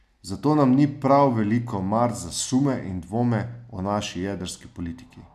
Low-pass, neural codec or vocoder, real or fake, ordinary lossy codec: 14.4 kHz; none; real; none